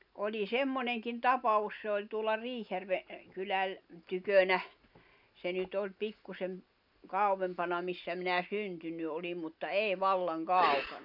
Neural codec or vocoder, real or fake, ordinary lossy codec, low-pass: none; real; none; 5.4 kHz